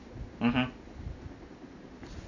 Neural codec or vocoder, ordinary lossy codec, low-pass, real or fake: none; none; 7.2 kHz; real